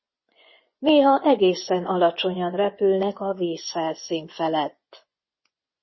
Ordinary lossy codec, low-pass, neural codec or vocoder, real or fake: MP3, 24 kbps; 7.2 kHz; vocoder, 24 kHz, 100 mel bands, Vocos; fake